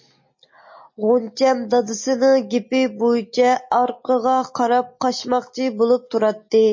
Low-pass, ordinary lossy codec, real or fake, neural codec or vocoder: 7.2 kHz; MP3, 32 kbps; real; none